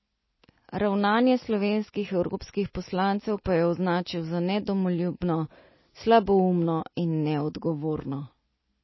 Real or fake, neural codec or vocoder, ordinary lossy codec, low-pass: real; none; MP3, 24 kbps; 7.2 kHz